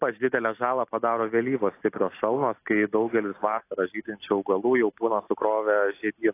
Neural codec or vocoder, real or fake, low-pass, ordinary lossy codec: none; real; 3.6 kHz; AAC, 24 kbps